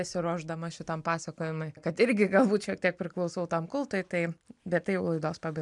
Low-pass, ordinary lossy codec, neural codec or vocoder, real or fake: 10.8 kHz; MP3, 96 kbps; none; real